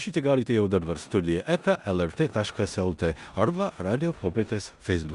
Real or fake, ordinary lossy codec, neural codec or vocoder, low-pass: fake; Opus, 64 kbps; codec, 16 kHz in and 24 kHz out, 0.9 kbps, LongCat-Audio-Codec, four codebook decoder; 10.8 kHz